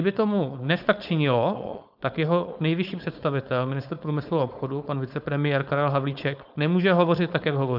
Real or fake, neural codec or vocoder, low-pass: fake; codec, 16 kHz, 4.8 kbps, FACodec; 5.4 kHz